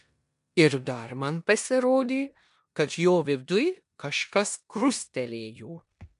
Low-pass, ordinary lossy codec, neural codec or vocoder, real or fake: 10.8 kHz; MP3, 64 kbps; codec, 16 kHz in and 24 kHz out, 0.9 kbps, LongCat-Audio-Codec, four codebook decoder; fake